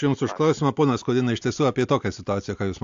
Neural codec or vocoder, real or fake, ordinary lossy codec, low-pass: none; real; AAC, 64 kbps; 7.2 kHz